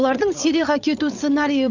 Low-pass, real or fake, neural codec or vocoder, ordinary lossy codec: 7.2 kHz; fake; codec, 16 kHz, 8 kbps, FreqCodec, larger model; none